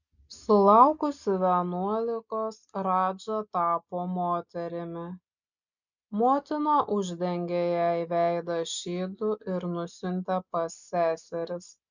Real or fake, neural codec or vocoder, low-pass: real; none; 7.2 kHz